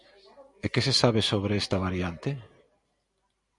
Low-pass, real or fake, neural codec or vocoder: 10.8 kHz; real; none